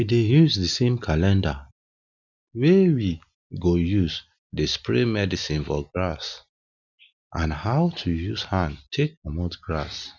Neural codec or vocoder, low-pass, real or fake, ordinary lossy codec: none; 7.2 kHz; real; none